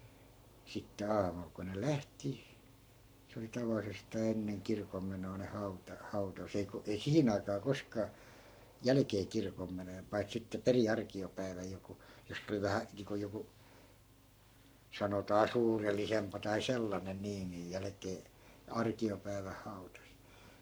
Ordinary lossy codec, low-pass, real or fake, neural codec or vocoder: none; none; fake; codec, 44.1 kHz, 7.8 kbps, Pupu-Codec